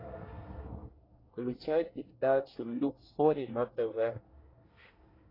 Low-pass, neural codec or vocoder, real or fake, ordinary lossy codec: 5.4 kHz; codec, 24 kHz, 1 kbps, SNAC; fake; AAC, 24 kbps